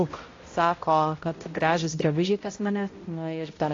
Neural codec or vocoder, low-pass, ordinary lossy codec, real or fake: codec, 16 kHz, 0.5 kbps, X-Codec, HuBERT features, trained on balanced general audio; 7.2 kHz; AAC, 32 kbps; fake